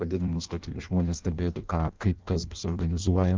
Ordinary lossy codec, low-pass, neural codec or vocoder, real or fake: Opus, 32 kbps; 7.2 kHz; codec, 16 kHz in and 24 kHz out, 0.6 kbps, FireRedTTS-2 codec; fake